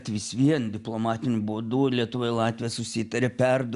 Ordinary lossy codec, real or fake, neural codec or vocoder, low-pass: Opus, 64 kbps; real; none; 10.8 kHz